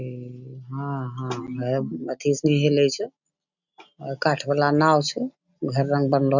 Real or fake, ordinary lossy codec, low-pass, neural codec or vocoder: real; none; 7.2 kHz; none